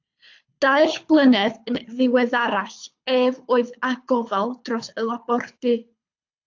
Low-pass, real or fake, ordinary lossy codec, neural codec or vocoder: 7.2 kHz; fake; AAC, 48 kbps; codec, 24 kHz, 6 kbps, HILCodec